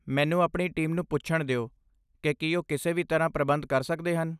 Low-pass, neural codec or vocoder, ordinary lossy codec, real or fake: 14.4 kHz; none; none; real